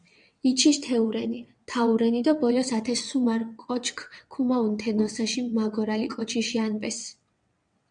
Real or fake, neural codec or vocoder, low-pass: fake; vocoder, 22.05 kHz, 80 mel bands, WaveNeXt; 9.9 kHz